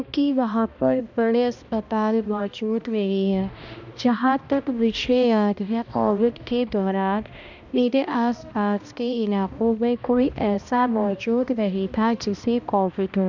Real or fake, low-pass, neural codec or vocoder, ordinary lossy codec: fake; 7.2 kHz; codec, 16 kHz, 1 kbps, X-Codec, HuBERT features, trained on balanced general audio; none